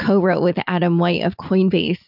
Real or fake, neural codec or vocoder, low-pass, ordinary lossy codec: real; none; 5.4 kHz; AAC, 48 kbps